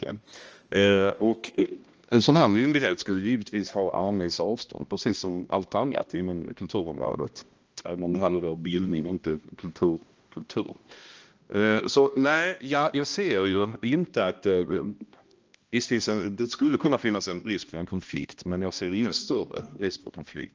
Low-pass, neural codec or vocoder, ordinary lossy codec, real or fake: 7.2 kHz; codec, 16 kHz, 1 kbps, X-Codec, HuBERT features, trained on balanced general audio; Opus, 24 kbps; fake